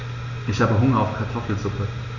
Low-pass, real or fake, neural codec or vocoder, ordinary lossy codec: 7.2 kHz; real; none; none